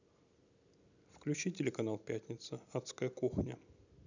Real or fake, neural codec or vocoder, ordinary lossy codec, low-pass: real; none; none; 7.2 kHz